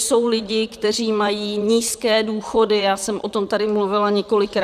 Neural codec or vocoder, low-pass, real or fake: vocoder, 44.1 kHz, 128 mel bands, Pupu-Vocoder; 14.4 kHz; fake